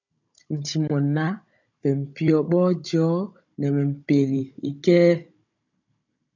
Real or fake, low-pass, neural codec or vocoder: fake; 7.2 kHz; codec, 16 kHz, 16 kbps, FunCodec, trained on Chinese and English, 50 frames a second